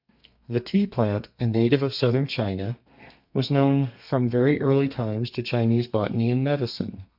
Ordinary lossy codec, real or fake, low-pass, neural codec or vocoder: MP3, 48 kbps; fake; 5.4 kHz; codec, 32 kHz, 1.9 kbps, SNAC